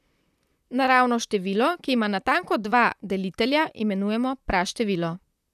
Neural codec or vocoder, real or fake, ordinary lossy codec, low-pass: vocoder, 44.1 kHz, 128 mel bands, Pupu-Vocoder; fake; none; 14.4 kHz